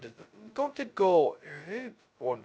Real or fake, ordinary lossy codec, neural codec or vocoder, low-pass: fake; none; codec, 16 kHz, 0.2 kbps, FocalCodec; none